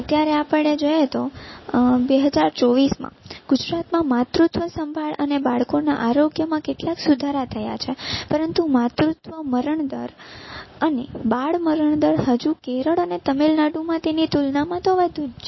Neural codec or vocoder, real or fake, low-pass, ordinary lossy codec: none; real; 7.2 kHz; MP3, 24 kbps